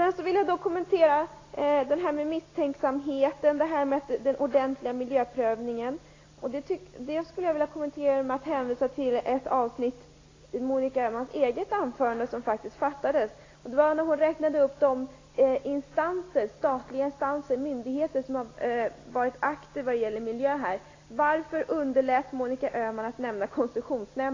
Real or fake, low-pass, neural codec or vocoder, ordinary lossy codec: real; 7.2 kHz; none; AAC, 32 kbps